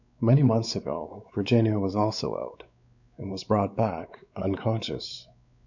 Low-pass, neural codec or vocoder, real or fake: 7.2 kHz; codec, 16 kHz, 4 kbps, X-Codec, WavLM features, trained on Multilingual LibriSpeech; fake